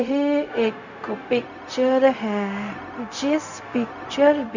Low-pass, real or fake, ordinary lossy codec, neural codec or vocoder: 7.2 kHz; fake; none; codec, 16 kHz, 0.4 kbps, LongCat-Audio-Codec